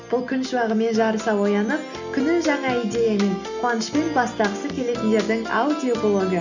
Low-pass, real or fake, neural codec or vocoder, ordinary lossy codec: 7.2 kHz; real; none; none